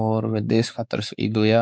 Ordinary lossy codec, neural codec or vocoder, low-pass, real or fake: none; codec, 16 kHz, 4 kbps, X-Codec, WavLM features, trained on Multilingual LibriSpeech; none; fake